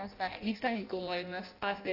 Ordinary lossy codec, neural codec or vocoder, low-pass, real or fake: AAC, 32 kbps; codec, 16 kHz in and 24 kHz out, 0.6 kbps, FireRedTTS-2 codec; 5.4 kHz; fake